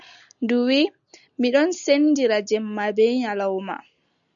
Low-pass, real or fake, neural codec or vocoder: 7.2 kHz; real; none